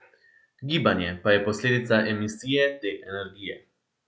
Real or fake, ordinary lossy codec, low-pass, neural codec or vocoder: real; none; none; none